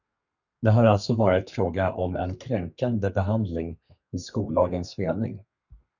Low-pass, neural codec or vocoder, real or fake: 7.2 kHz; codec, 32 kHz, 1.9 kbps, SNAC; fake